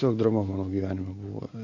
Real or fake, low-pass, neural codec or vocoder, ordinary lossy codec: real; 7.2 kHz; none; AAC, 48 kbps